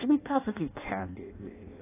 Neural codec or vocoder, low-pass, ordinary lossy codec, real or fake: codec, 16 kHz in and 24 kHz out, 0.6 kbps, FireRedTTS-2 codec; 3.6 kHz; AAC, 16 kbps; fake